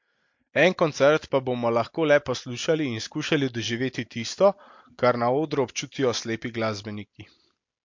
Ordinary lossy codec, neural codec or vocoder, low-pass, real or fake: MP3, 48 kbps; none; 7.2 kHz; real